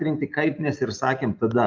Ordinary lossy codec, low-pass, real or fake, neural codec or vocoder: Opus, 24 kbps; 7.2 kHz; real; none